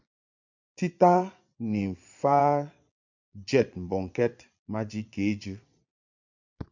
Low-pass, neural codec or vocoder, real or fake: 7.2 kHz; vocoder, 22.05 kHz, 80 mel bands, Vocos; fake